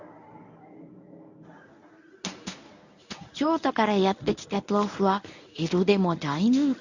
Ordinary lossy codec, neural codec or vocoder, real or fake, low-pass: none; codec, 24 kHz, 0.9 kbps, WavTokenizer, medium speech release version 1; fake; 7.2 kHz